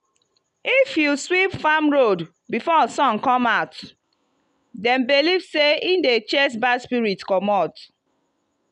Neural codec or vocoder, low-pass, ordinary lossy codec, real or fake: none; 10.8 kHz; none; real